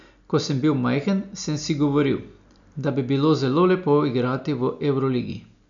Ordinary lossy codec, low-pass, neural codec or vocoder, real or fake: none; 7.2 kHz; none; real